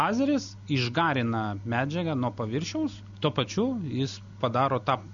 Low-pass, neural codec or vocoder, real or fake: 7.2 kHz; none; real